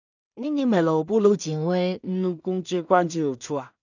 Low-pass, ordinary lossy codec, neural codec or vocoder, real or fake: 7.2 kHz; none; codec, 16 kHz in and 24 kHz out, 0.4 kbps, LongCat-Audio-Codec, two codebook decoder; fake